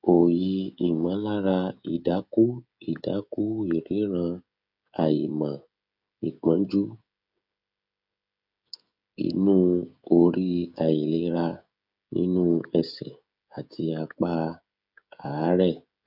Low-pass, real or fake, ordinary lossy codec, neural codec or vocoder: 5.4 kHz; fake; none; codec, 16 kHz, 16 kbps, FreqCodec, smaller model